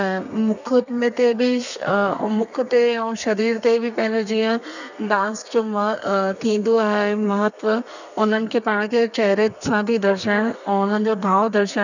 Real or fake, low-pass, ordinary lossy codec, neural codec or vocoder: fake; 7.2 kHz; none; codec, 32 kHz, 1.9 kbps, SNAC